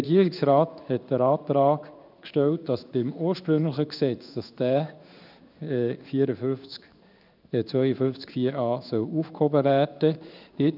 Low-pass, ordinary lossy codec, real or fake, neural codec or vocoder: 5.4 kHz; none; fake; codec, 16 kHz in and 24 kHz out, 1 kbps, XY-Tokenizer